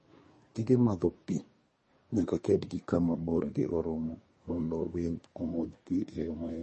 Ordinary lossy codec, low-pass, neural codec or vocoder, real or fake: MP3, 32 kbps; 10.8 kHz; codec, 24 kHz, 1 kbps, SNAC; fake